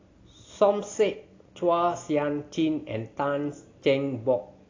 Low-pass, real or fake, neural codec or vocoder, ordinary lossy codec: 7.2 kHz; real; none; AAC, 32 kbps